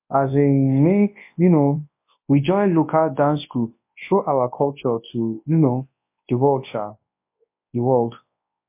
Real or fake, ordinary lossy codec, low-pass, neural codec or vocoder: fake; MP3, 24 kbps; 3.6 kHz; codec, 24 kHz, 0.9 kbps, WavTokenizer, large speech release